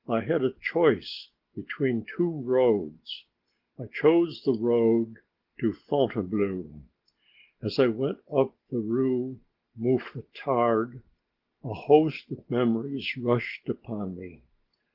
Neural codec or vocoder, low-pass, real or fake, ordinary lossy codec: none; 5.4 kHz; real; Opus, 16 kbps